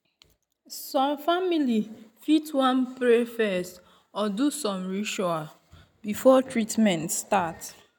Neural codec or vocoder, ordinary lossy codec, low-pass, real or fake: none; none; none; real